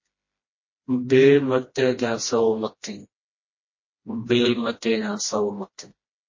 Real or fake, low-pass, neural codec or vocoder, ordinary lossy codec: fake; 7.2 kHz; codec, 16 kHz, 1 kbps, FreqCodec, smaller model; MP3, 32 kbps